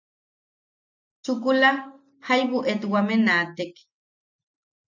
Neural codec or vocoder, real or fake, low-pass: none; real; 7.2 kHz